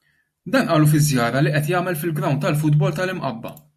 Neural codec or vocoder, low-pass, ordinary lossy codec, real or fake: none; 14.4 kHz; MP3, 64 kbps; real